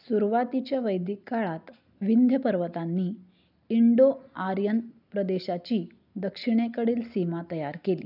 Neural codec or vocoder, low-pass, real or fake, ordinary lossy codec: none; 5.4 kHz; real; none